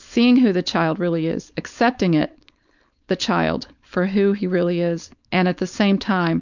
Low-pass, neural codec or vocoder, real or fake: 7.2 kHz; codec, 16 kHz, 4.8 kbps, FACodec; fake